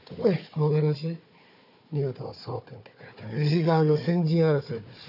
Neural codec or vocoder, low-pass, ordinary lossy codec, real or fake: codec, 16 kHz, 4 kbps, FunCodec, trained on Chinese and English, 50 frames a second; 5.4 kHz; none; fake